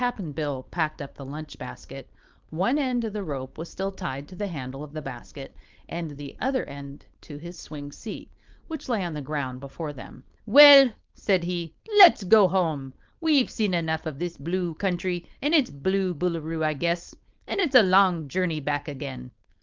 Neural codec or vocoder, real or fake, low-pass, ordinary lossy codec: codec, 16 kHz, 4.8 kbps, FACodec; fake; 7.2 kHz; Opus, 24 kbps